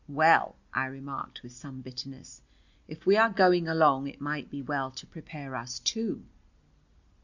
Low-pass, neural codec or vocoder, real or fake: 7.2 kHz; none; real